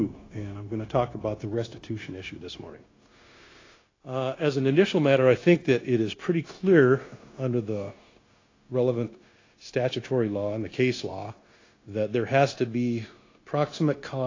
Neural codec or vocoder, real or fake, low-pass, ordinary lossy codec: codec, 16 kHz, 0.9 kbps, LongCat-Audio-Codec; fake; 7.2 kHz; AAC, 32 kbps